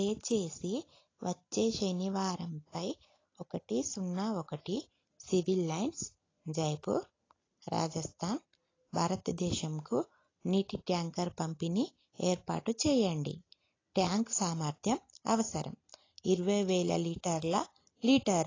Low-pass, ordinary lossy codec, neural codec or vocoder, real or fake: 7.2 kHz; AAC, 32 kbps; none; real